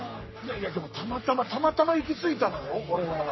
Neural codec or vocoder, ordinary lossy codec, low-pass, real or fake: codec, 44.1 kHz, 3.4 kbps, Pupu-Codec; MP3, 24 kbps; 7.2 kHz; fake